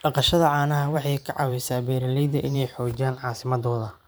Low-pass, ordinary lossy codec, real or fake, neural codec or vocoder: none; none; fake; vocoder, 44.1 kHz, 128 mel bands every 512 samples, BigVGAN v2